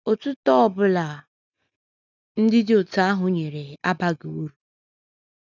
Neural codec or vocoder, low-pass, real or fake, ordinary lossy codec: none; 7.2 kHz; real; none